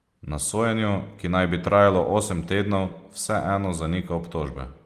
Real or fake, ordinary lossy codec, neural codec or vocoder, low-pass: real; Opus, 24 kbps; none; 14.4 kHz